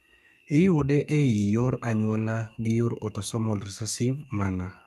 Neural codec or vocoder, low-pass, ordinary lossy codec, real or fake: codec, 32 kHz, 1.9 kbps, SNAC; 14.4 kHz; none; fake